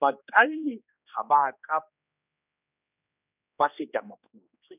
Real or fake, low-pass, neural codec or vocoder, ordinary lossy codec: fake; 3.6 kHz; codec, 16 kHz, 2 kbps, X-Codec, HuBERT features, trained on general audio; none